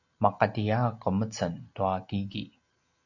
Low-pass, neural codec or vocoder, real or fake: 7.2 kHz; none; real